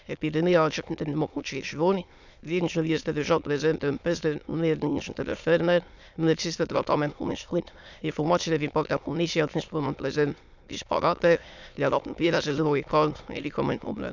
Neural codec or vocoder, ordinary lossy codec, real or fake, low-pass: autoencoder, 22.05 kHz, a latent of 192 numbers a frame, VITS, trained on many speakers; none; fake; 7.2 kHz